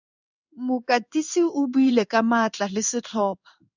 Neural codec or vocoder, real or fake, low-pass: codec, 16 kHz in and 24 kHz out, 1 kbps, XY-Tokenizer; fake; 7.2 kHz